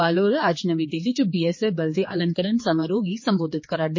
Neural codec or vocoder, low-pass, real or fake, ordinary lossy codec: codec, 16 kHz, 4 kbps, X-Codec, HuBERT features, trained on general audio; 7.2 kHz; fake; MP3, 32 kbps